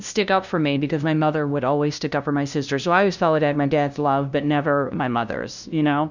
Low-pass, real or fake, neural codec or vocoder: 7.2 kHz; fake; codec, 16 kHz, 0.5 kbps, FunCodec, trained on LibriTTS, 25 frames a second